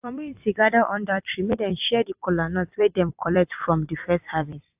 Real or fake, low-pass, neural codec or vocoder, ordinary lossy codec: fake; 3.6 kHz; vocoder, 44.1 kHz, 128 mel bands every 256 samples, BigVGAN v2; AAC, 32 kbps